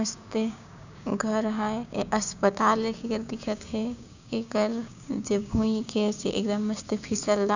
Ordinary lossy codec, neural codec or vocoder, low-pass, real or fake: none; codec, 16 kHz, 6 kbps, DAC; 7.2 kHz; fake